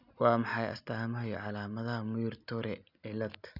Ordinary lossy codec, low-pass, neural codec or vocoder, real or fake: none; 5.4 kHz; none; real